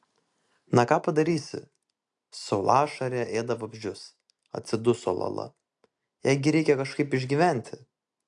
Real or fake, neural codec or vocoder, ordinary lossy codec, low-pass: real; none; AAC, 64 kbps; 10.8 kHz